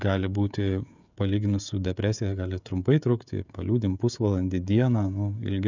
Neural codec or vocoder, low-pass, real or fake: codec, 16 kHz, 16 kbps, FreqCodec, smaller model; 7.2 kHz; fake